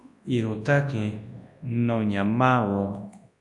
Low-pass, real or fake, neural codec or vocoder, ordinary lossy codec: 10.8 kHz; fake; codec, 24 kHz, 0.9 kbps, WavTokenizer, large speech release; AAC, 64 kbps